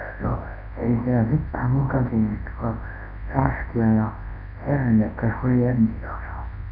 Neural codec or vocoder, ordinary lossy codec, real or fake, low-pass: codec, 24 kHz, 0.9 kbps, WavTokenizer, large speech release; none; fake; 5.4 kHz